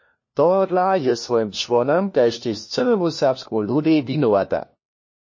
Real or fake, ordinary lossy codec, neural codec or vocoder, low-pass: fake; MP3, 32 kbps; codec, 16 kHz, 1 kbps, FunCodec, trained on LibriTTS, 50 frames a second; 7.2 kHz